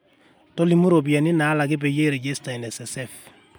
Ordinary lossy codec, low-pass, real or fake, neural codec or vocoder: none; none; real; none